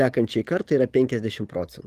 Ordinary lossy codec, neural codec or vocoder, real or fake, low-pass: Opus, 24 kbps; codec, 44.1 kHz, 7.8 kbps, DAC; fake; 14.4 kHz